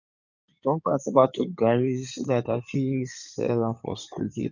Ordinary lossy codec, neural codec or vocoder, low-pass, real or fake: none; codec, 16 kHz in and 24 kHz out, 2.2 kbps, FireRedTTS-2 codec; 7.2 kHz; fake